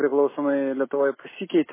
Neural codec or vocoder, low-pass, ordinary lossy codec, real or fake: none; 3.6 kHz; MP3, 16 kbps; real